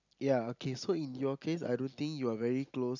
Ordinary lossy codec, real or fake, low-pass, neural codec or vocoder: none; real; 7.2 kHz; none